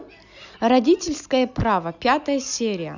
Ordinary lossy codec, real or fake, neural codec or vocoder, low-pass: none; real; none; 7.2 kHz